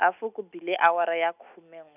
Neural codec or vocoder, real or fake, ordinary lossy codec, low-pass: none; real; none; 3.6 kHz